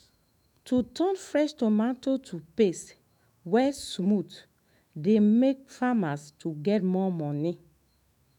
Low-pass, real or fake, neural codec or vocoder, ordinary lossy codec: 19.8 kHz; fake; autoencoder, 48 kHz, 128 numbers a frame, DAC-VAE, trained on Japanese speech; none